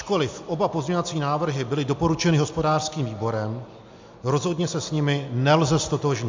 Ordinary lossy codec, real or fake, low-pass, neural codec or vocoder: MP3, 64 kbps; real; 7.2 kHz; none